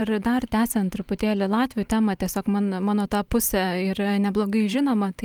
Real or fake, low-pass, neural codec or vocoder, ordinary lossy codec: fake; 19.8 kHz; vocoder, 44.1 kHz, 128 mel bands every 512 samples, BigVGAN v2; Opus, 32 kbps